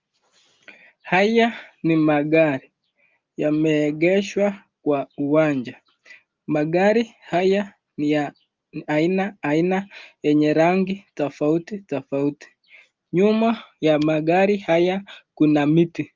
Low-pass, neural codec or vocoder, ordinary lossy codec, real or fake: 7.2 kHz; none; Opus, 32 kbps; real